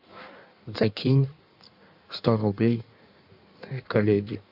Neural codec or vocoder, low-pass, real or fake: codec, 16 kHz in and 24 kHz out, 1.1 kbps, FireRedTTS-2 codec; 5.4 kHz; fake